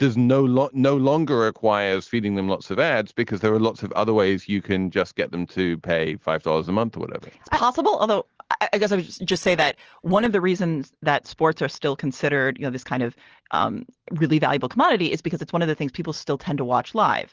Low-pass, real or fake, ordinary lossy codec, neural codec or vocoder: 7.2 kHz; real; Opus, 16 kbps; none